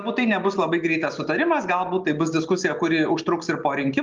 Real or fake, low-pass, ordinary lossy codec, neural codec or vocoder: real; 7.2 kHz; Opus, 24 kbps; none